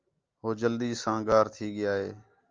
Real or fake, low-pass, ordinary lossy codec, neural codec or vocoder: real; 7.2 kHz; Opus, 24 kbps; none